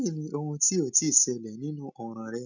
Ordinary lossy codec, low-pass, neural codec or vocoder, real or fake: none; 7.2 kHz; none; real